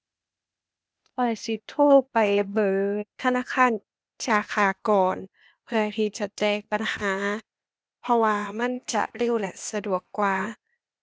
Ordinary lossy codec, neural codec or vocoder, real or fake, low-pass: none; codec, 16 kHz, 0.8 kbps, ZipCodec; fake; none